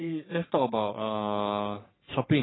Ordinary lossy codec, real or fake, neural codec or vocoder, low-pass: AAC, 16 kbps; fake; codec, 44.1 kHz, 3.4 kbps, Pupu-Codec; 7.2 kHz